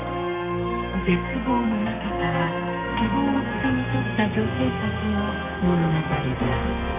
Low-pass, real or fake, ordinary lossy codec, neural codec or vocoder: 3.6 kHz; fake; none; codec, 32 kHz, 1.9 kbps, SNAC